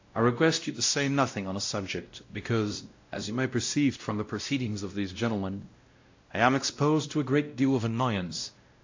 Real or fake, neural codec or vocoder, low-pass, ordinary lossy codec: fake; codec, 16 kHz, 0.5 kbps, X-Codec, WavLM features, trained on Multilingual LibriSpeech; 7.2 kHz; AAC, 48 kbps